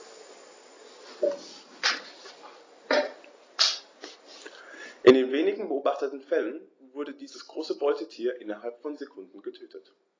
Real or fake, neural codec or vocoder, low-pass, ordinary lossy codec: real; none; 7.2 kHz; AAC, 32 kbps